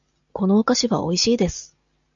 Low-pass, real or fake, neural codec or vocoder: 7.2 kHz; real; none